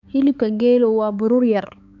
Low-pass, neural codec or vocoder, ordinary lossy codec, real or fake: 7.2 kHz; codec, 16 kHz, 4 kbps, X-Codec, HuBERT features, trained on balanced general audio; none; fake